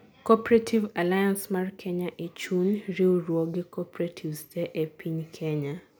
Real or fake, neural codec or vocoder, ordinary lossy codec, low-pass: real; none; none; none